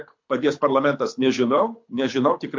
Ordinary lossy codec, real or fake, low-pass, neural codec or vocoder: MP3, 48 kbps; fake; 7.2 kHz; codec, 24 kHz, 6 kbps, HILCodec